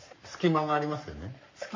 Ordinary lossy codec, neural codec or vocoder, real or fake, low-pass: MP3, 32 kbps; codec, 44.1 kHz, 7.8 kbps, Pupu-Codec; fake; 7.2 kHz